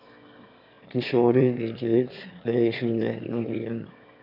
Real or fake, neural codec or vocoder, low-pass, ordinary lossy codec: fake; autoencoder, 22.05 kHz, a latent of 192 numbers a frame, VITS, trained on one speaker; 5.4 kHz; AAC, 48 kbps